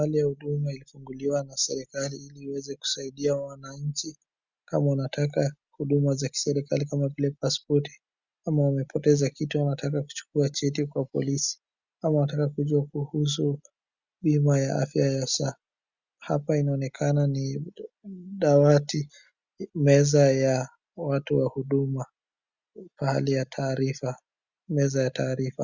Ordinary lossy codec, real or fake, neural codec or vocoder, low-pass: Opus, 64 kbps; real; none; 7.2 kHz